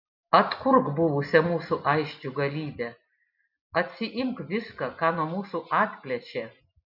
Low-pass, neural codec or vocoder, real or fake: 5.4 kHz; none; real